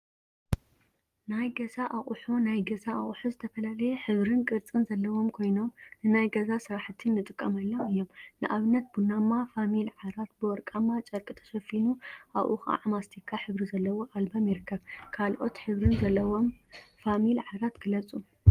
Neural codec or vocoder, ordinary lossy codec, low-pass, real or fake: none; Opus, 24 kbps; 14.4 kHz; real